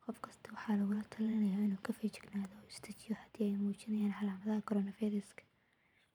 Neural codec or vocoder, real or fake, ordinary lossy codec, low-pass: vocoder, 44.1 kHz, 128 mel bands every 512 samples, BigVGAN v2; fake; none; 19.8 kHz